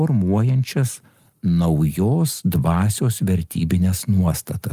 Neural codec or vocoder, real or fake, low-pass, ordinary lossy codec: none; real; 14.4 kHz; Opus, 32 kbps